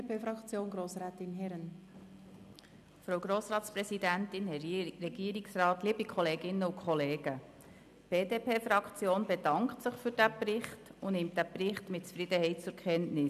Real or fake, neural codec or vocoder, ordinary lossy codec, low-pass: real; none; none; 14.4 kHz